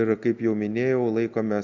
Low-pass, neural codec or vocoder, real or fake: 7.2 kHz; none; real